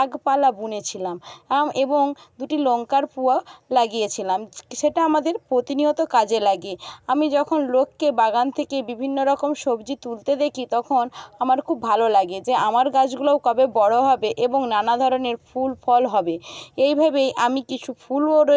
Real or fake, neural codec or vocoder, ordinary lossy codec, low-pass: real; none; none; none